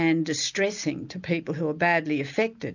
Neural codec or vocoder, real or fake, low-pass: none; real; 7.2 kHz